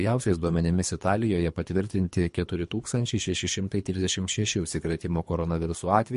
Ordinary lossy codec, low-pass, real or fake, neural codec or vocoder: MP3, 48 kbps; 10.8 kHz; fake; codec, 24 kHz, 3 kbps, HILCodec